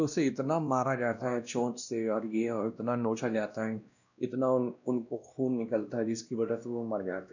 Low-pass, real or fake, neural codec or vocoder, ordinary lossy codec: 7.2 kHz; fake; codec, 16 kHz, 1 kbps, X-Codec, WavLM features, trained on Multilingual LibriSpeech; none